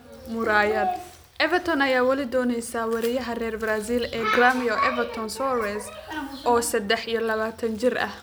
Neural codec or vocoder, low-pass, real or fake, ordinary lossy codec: none; none; real; none